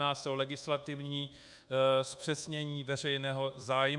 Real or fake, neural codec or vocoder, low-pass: fake; codec, 24 kHz, 1.2 kbps, DualCodec; 10.8 kHz